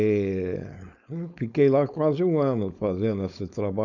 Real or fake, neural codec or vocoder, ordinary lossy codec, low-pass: fake; codec, 16 kHz, 4.8 kbps, FACodec; none; 7.2 kHz